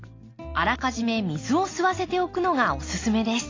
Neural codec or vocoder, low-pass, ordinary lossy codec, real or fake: none; 7.2 kHz; AAC, 32 kbps; real